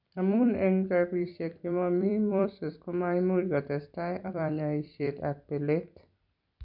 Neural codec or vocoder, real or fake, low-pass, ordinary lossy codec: vocoder, 44.1 kHz, 128 mel bands every 256 samples, BigVGAN v2; fake; 5.4 kHz; none